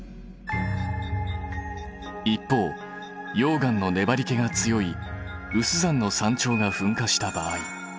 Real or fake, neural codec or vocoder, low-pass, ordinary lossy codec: real; none; none; none